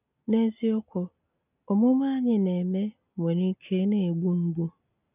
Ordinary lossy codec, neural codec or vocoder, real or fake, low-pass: none; none; real; 3.6 kHz